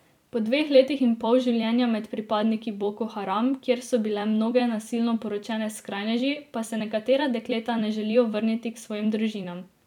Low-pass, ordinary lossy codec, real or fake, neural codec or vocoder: 19.8 kHz; none; fake; vocoder, 44.1 kHz, 128 mel bands every 256 samples, BigVGAN v2